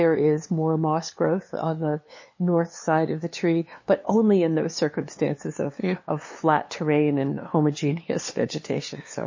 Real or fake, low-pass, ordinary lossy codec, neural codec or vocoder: fake; 7.2 kHz; MP3, 32 kbps; codec, 16 kHz, 2 kbps, FunCodec, trained on LibriTTS, 25 frames a second